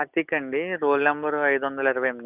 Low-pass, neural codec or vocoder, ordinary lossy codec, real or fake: 3.6 kHz; none; none; real